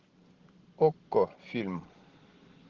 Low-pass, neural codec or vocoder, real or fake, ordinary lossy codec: 7.2 kHz; none; real; Opus, 16 kbps